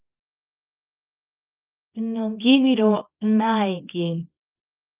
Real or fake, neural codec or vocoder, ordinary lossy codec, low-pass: fake; codec, 24 kHz, 0.9 kbps, WavTokenizer, small release; Opus, 32 kbps; 3.6 kHz